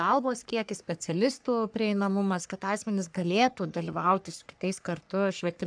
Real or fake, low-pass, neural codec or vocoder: fake; 9.9 kHz; codec, 44.1 kHz, 3.4 kbps, Pupu-Codec